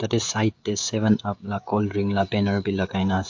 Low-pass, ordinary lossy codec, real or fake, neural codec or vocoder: 7.2 kHz; AAC, 48 kbps; fake; codec, 16 kHz, 16 kbps, FreqCodec, smaller model